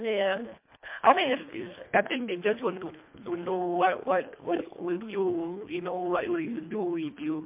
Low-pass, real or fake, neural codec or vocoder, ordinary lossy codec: 3.6 kHz; fake; codec, 24 kHz, 1.5 kbps, HILCodec; MP3, 32 kbps